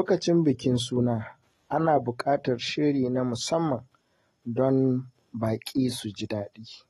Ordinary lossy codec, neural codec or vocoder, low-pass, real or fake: AAC, 32 kbps; none; 19.8 kHz; real